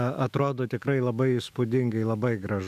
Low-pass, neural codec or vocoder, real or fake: 14.4 kHz; autoencoder, 48 kHz, 128 numbers a frame, DAC-VAE, trained on Japanese speech; fake